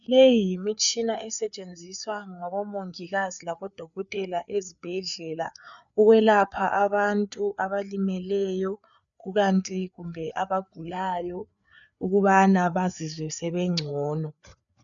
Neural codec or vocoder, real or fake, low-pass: codec, 16 kHz, 4 kbps, FreqCodec, larger model; fake; 7.2 kHz